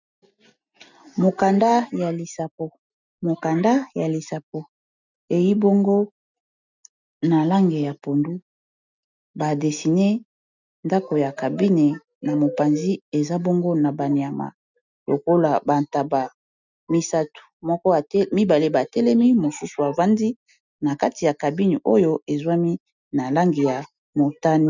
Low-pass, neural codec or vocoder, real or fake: 7.2 kHz; none; real